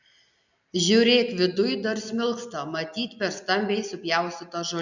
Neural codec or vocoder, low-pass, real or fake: none; 7.2 kHz; real